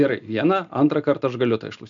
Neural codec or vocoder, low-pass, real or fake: none; 7.2 kHz; real